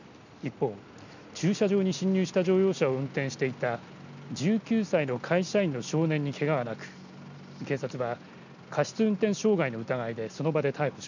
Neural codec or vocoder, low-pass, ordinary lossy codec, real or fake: none; 7.2 kHz; none; real